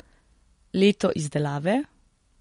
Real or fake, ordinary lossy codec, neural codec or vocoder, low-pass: real; MP3, 48 kbps; none; 10.8 kHz